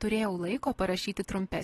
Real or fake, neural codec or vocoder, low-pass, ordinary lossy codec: real; none; 19.8 kHz; AAC, 32 kbps